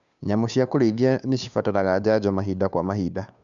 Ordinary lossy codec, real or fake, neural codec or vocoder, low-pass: none; fake; codec, 16 kHz, 2 kbps, FunCodec, trained on Chinese and English, 25 frames a second; 7.2 kHz